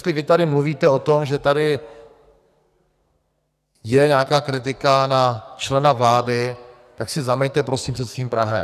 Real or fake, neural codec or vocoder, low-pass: fake; codec, 44.1 kHz, 2.6 kbps, SNAC; 14.4 kHz